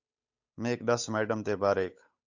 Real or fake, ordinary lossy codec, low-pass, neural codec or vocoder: fake; AAC, 48 kbps; 7.2 kHz; codec, 16 kHz, 8 kbps, FunCodec, trained on Chinese and English, 25 frames a second